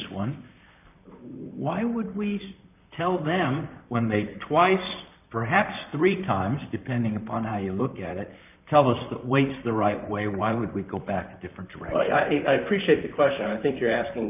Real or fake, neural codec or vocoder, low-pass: fake; vocoder, 44.1 kHz, 128 mel bands, Pupu-Vocoder; 3.6 kHz